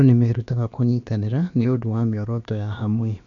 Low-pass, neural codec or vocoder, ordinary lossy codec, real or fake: 7.2 kHz; codec, 16 kHz, about 1 kbps, DyCAST, with the encoder's durations; none; fake